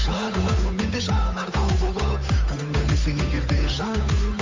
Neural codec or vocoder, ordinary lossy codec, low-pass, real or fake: codec, 16 kHz, 8 kbps, FunCodec, trained on Chinese and English, 25 frames a second; MP3, 48 kbps; 7.2 kHz; fake